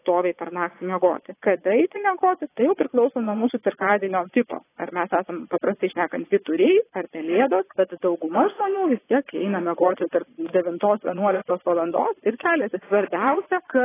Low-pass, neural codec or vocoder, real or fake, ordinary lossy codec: 3.6 kHz; vocoder, 22.05 kHz, 80 mel bands, Vocos; fake; AAC, 16 kbps